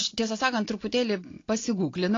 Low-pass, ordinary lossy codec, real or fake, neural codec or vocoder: 7.2 kHz; AAC, 32 kbps; real; none